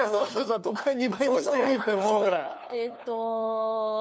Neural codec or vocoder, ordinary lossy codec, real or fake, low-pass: codec, 16 kHz, 2 kbps, FunCodec, trained on LibriTTS, 25 frames a second; none; fake; none